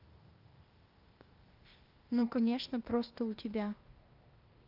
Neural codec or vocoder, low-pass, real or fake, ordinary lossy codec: codec, 16 kHz, 0.8 kbps, ZipCodec; 5.4 kHz; fake; Opus, 24 kbps